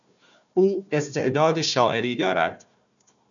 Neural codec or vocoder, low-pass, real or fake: codec, 16 kHz, 1 kbps, FunCodec, trained on Chinese and English, 50 frames a second; 7.2 kHz; fake